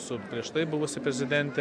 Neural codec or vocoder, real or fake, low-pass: none; real; 9.9 kHz